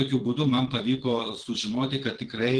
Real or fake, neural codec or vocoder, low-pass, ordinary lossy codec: fake; vocoder, 22.05 kHz, 80 mel bands, WaveNeXt; 9.9 kHz; Opus, 16 kbps